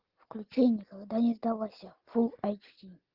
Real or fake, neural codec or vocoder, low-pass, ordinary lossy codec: real; none; 5.4 kHz; Opus, 16 kbps